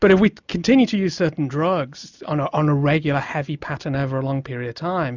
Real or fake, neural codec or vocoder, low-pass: real; none; 7.2 kHz